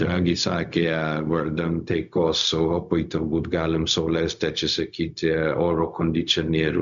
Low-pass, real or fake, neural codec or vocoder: 7.2 kHz; fake; codec, 16 kHz, 0.4 kbps, LongCat-Audio-Codec